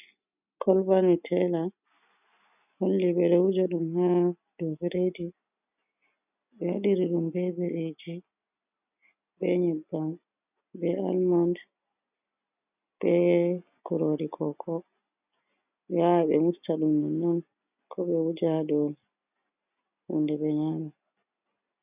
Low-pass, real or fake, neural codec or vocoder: 3.6 kHz; real; none